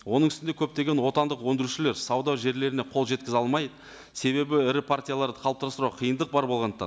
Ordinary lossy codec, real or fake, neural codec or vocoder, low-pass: none; real; none; none